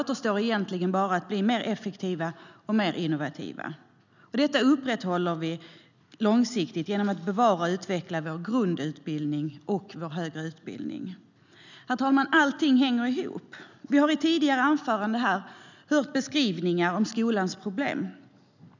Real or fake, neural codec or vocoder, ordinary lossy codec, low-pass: real; none; none; 7.2 kHz